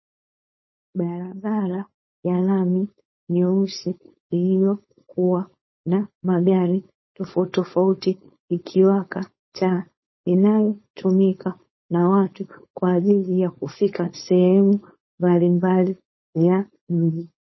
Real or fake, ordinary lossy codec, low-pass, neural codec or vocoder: fake; MP3, 24 kbps; 7.2 kHz; codec, 16 kHz, 4.8 kbps, FACodec